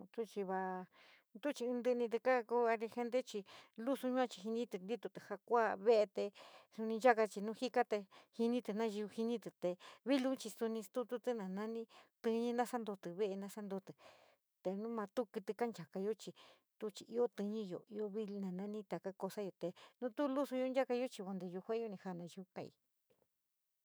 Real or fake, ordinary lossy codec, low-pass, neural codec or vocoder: fake; none; none; autoencoder, 48 kHz, 128 numbers a frame, DAC-VAE, trained on Japanese speech